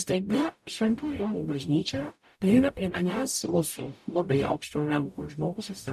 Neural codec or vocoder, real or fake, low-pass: codec, 44.1 kHz, 0.9 kbps, DAC; fake; 14.4 kHz